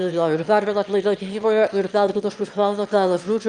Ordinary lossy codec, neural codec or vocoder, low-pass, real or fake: Opus, 32 kbps; autoencoder, 22.05 kHz, a latent of 192 numbers a frame, VITS, trained on one speaker; 9.9 kHz; fake